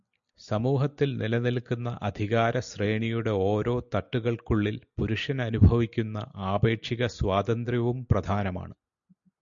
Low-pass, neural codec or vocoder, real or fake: 7.2 kHz; none; real